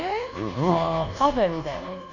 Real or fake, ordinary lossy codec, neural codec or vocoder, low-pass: fake; none; codec, 24 kHz, 1.2 kbps, DualCodec; 7.2 kHz